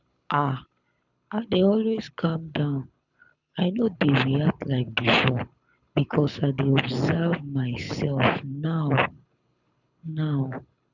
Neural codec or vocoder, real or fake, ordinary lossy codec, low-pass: codec, 24 kHz, 6 kbps, HILCodec; fake; none; 7.2 kHz